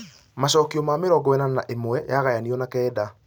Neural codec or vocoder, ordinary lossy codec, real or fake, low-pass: none; none; real; none